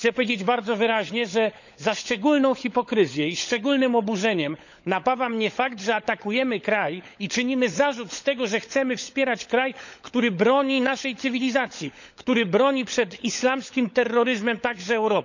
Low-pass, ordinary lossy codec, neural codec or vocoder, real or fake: 7.2 kHz; none; codec, 16 kHz, 16 kbps, FunCodec, trained on LibriTTS, 50 frames a second; fake